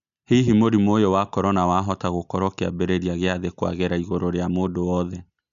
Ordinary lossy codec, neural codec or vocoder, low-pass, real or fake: none; none; 7.2 kHz; real